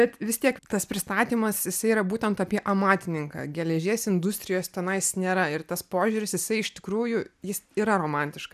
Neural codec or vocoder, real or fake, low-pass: none; real; 14.4 kHz